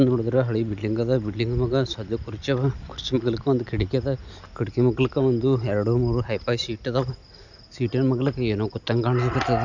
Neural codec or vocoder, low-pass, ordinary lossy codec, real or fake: none; 7.2 kHz; none; real